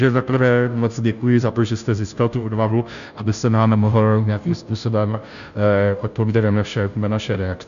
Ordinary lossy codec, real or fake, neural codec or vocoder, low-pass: MP3, 96 kbps; fake; codec, 16 kHz, 0.5 kbps, FunCodec, trained on Chinese and English, 25 frames a second; 7.2 kHz